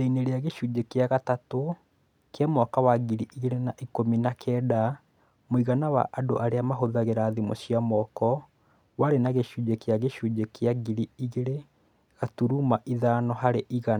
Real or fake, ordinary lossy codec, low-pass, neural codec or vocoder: real; none; 19.8 kHz; none